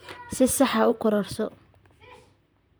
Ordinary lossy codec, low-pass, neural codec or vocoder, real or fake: none; none; vocoder, 44.1 kHz, 128 mel bands, Pupu-Vocoder; fake